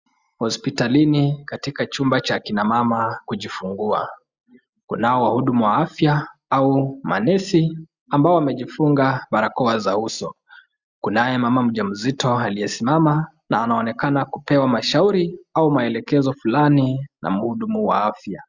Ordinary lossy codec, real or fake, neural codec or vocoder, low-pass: Opus, 64 kbps; real; none; 7.2 kHz